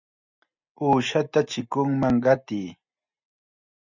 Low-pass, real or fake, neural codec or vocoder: 7.2 kHz; real; none